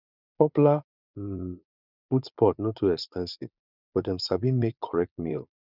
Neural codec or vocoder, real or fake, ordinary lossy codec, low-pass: vocoder, 22.05 kHz, 80 mel bands, Vocos; fake; none; 5.4 kHz